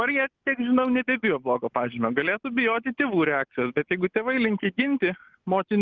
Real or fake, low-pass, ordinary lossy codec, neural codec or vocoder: real; 7.2 kHz; Opus, 24 kbps; none